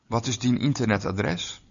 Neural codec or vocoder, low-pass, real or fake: none; 7.2 kHz; real